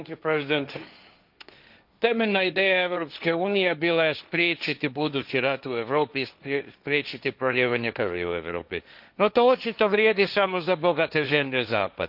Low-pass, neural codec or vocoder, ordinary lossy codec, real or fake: 5.4 kHz; codec, 16 kHz, 1.1 kbps, Voila-Tokenizer; none; fake